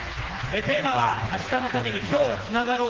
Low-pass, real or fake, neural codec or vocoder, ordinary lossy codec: 7.2 kHz; fake; codec, 16 kHz, 2 kbps, FreqCodec, smaller model; Opus, 16 kbps